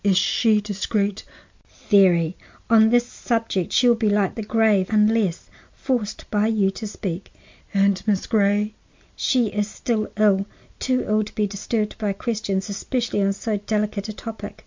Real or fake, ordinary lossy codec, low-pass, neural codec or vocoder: real; MP3, 64 kbps; 7.2 kHz; none